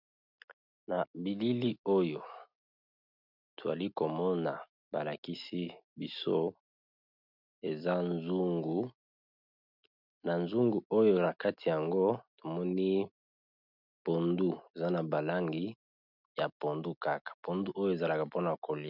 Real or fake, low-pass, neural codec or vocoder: real; 5.4 kHz; none